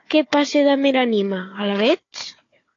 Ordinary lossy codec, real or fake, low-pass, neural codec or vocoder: AAC, 32 kbps; fake; 7.2 kHz; codec, 16 kHz, 4 kbps, FunCodec, trained on Chinese and English, 50 frames a second